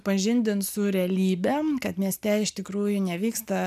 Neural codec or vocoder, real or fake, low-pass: none; real; 14.4 kHz